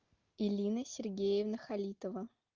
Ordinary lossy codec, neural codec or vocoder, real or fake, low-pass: Opus, 32 kbps; none; real; 7.2 kHz